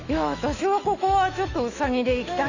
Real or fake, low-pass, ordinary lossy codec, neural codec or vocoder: fake; 7.2 kHz; Opus, 64 kbps; autoencoder, 48 kHz, 128 numbers a frame, DAC-VAE, trained on Japanese speech